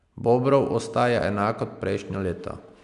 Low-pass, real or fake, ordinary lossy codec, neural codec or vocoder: 10.8 kHz; real; none; none